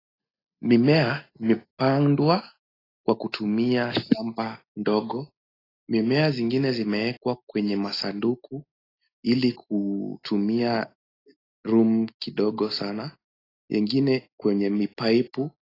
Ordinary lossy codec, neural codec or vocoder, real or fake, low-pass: AAC, 24 kbps; none; real; 5.4 kHz